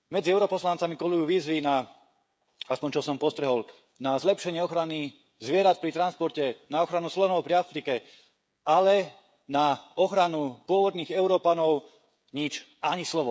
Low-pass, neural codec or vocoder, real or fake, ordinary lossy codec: none; codec, 16 kHz, 16 kbps, FreqCodec, smaller model; fake; none